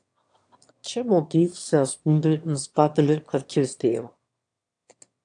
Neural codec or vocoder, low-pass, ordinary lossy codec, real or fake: autoencoder, 22.05 kHz, a latent of 192 numbers a frame, VITS, trained on one speaker; 9.9 kHz; AAC, 64 kbps; fake